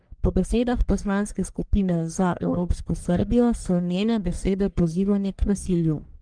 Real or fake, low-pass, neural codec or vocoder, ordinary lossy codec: fake; 9.9 kHz; codec, 44.1 kHz, 1.7 kbps, Pupu-Codec; Opus, 32 kbps